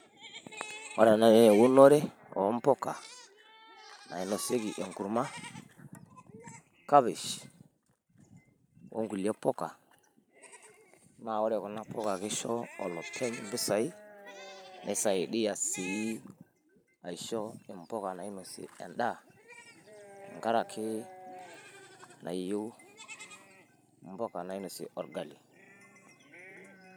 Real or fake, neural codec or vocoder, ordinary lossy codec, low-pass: fake; vocoder, 44.1 kHz, 128 mel bands every 512 samples, BigVGAN v2; none; none